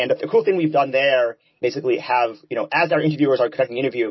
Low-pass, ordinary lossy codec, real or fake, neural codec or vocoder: 7.2 kHz; MP3, 24 kbps; real; none